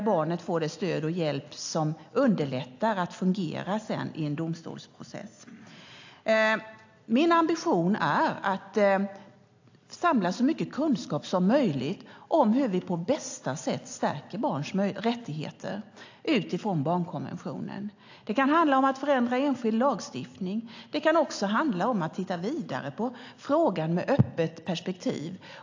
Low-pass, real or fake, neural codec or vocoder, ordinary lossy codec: 7.2 kHz; real; none; AAC, 48 kbps